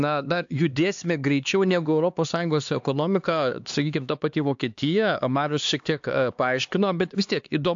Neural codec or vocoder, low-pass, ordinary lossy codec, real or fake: codec, 16 kHz, 2 kbps, X-Codec, HuBERT features, trained on LibriSpeech; 7.2 kHz; AAC, 64 kbps; fake